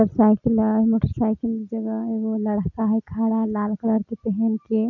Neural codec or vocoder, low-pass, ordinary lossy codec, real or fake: none; 7.2 kHz; none; real